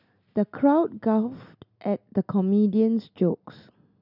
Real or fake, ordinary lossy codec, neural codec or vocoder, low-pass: real; none; none; 5.4 kHz